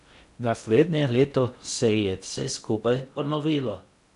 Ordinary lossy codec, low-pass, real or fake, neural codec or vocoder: none; 10.8 kHz; fake; codec, 16 kHz in and 24 kHz out, 0.6 kbps, FocalCodec, streaming, 2048 codes